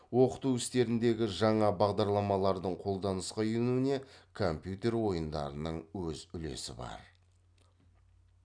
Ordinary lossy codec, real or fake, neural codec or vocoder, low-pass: none; real; none; 9.9 kHz